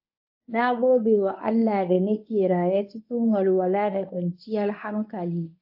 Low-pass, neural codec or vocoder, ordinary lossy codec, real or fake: 5.4 kHz; codec, 24 kHz, 0.9 kbps, WavTokenizer, medium speech release version 1; AAC, 48 kbps; fake